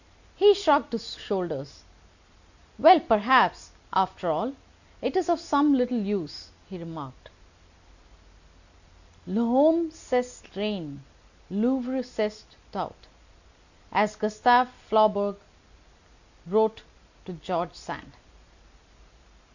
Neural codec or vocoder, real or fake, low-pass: none; real; 7.2 kHz